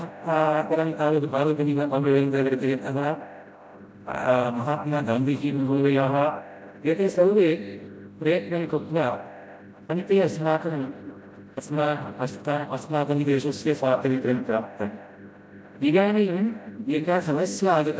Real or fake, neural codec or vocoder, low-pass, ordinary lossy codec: fake; codec, 16 kHz, 0.5 kbps, FreqCodec, smaller model; none; none